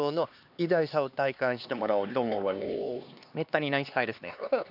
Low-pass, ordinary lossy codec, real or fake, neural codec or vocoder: 5.4 kHz; none; fake; codec, 16 kHz, 2 kbps, X-Codec, HuBERT features, trained on LibriSpeech